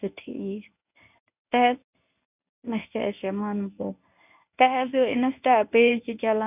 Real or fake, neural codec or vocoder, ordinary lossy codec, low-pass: fake; codec, 24 kHz, 0.9 kbps, WavTokenizer, medium speech release version 1; none; 3.6 kHz